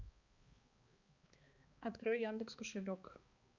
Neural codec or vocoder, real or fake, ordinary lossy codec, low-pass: codec, 16 kHz, 2 kbps, X-Codec, HuBERT features, trained on balanced general audio; fake; Opus, 64 kbps; 7.2 kHz